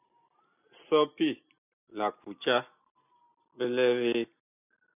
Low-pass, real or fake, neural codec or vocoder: 3.6 kHz; real; none